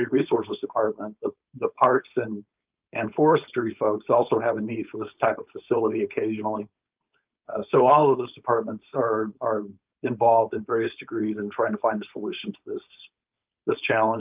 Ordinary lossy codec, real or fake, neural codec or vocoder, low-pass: Opus, 24 kbps; fake; codec, 16 kHz, 4.8 kbps, FACodec; 3.6 kHz